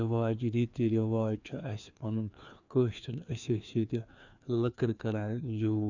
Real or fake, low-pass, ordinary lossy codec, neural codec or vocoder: fake; 7.2 kHz; none; codec, 16 kHz, 2 kbps, FreqCodec, larger model